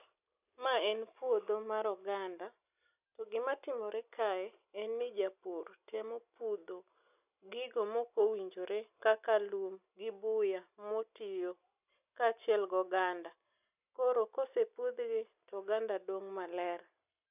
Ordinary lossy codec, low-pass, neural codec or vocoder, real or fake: none; 3.6 kHz; none; real